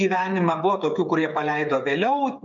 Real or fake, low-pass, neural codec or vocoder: fake; 7.2 kHz; codec, 16 kHz, 8 kbps, FreqCodec, smaller model